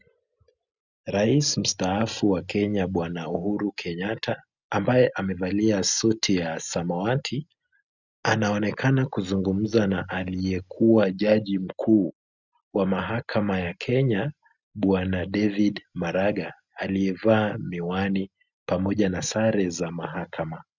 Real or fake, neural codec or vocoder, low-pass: real; none; 7.2 kHz